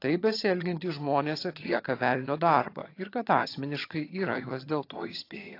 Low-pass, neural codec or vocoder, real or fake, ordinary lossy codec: 5.4 kHz; vocoder, 22.05 kHz, 80 mel bands, HiFi-GAN; fake; AAC, 32 kbps